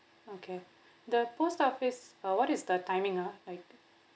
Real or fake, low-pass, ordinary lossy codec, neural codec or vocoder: real; none; none; none